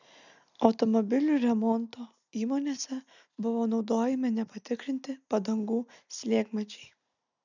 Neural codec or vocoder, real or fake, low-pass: none; real; 7.2 kHz